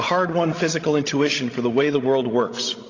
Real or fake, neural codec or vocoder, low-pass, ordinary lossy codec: fake; codec, 16 kHz, 16 kbps, FreqCodec, larger model; 7.2 kHz; AAC, 32 kbps